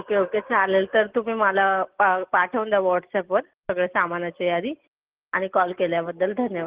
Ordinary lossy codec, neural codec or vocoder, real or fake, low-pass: Opus, 16 kbps; none; real; 3.6 kHz